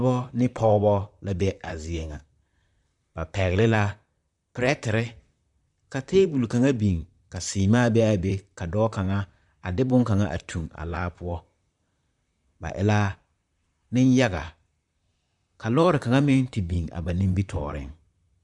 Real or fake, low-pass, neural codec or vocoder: fake; 10.8 kHz; vocoder, 44.1 kHz, 128 mel bands, Pupu-Vocoder